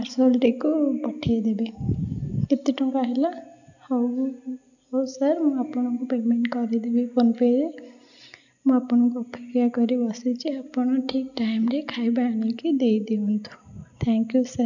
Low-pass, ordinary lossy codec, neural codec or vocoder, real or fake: 7.2 kHz; none; none; real